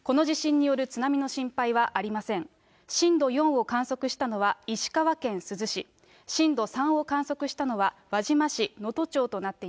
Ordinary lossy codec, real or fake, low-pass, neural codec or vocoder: none; real; none; none